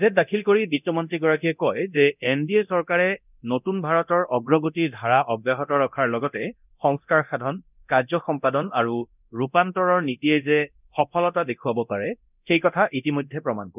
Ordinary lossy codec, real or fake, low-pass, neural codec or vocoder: none; fake; 3.6 kHz; codec, 24 kHz, 0.9 kbps, DualCodec